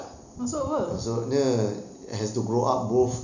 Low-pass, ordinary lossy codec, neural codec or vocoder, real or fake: 7.2 kHz; none; none; real